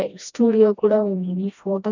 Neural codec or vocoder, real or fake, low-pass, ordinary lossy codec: codec, 16 kHz, 1 kbps, FreqCodec, smaller model; fake; 7.2 kHz; none